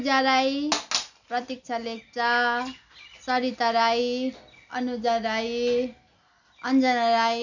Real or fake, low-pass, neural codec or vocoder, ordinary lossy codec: real; 7.2 kHz; none; none